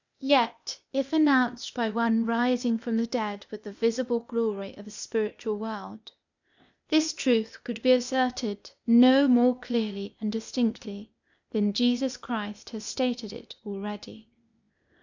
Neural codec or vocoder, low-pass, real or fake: codec, 16 kHz, 0.8 kbps, ZipCodec; 7.2 kHz; fake